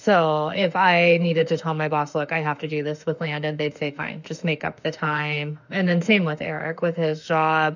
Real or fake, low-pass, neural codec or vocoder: fake; 7.2 kHz; autoencoder, 48 kHz, 32 numbers a frame, DAC-VAE, trained on Japanese speech